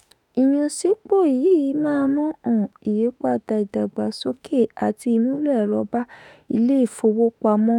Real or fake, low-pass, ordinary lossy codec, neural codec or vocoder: fake; 19.8 kHz; none; autoencoder, 48 kHz, 32 numbers a frame, DAC-VAE, trained on Japanese speech